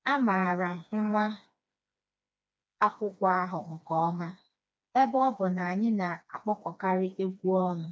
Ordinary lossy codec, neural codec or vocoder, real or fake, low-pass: none; codec, 16 kHz, 2 kbps, FreqCodec, smaller model; fake; none